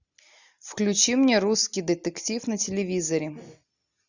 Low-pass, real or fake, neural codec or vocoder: 7.2 kHz; real; none